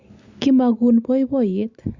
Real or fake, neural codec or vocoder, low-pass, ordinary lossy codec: real; none; 7.2 kHz; Opus, 64 kbps